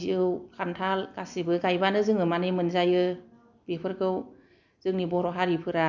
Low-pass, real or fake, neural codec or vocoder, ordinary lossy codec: 7.2 kHz; real; none; none